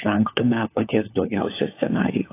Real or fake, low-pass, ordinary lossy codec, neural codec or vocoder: fake; 3.6 kHz; AAC, 24 kbps; codec, 16 kHz, 4 kbps, FunCodec, trained on LibriTTS, 50 frames a second